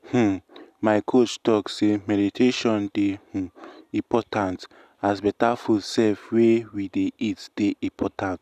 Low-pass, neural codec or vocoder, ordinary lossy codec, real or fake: 14.4 kHz; none; none; real